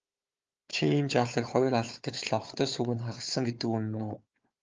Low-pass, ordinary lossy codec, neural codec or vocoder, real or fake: 7.2 kHz; Opus, 24 kbps; codec, 16 kHz, 4 kbps, FunCodec, trained on Chinese and English, 50 frames a second; fake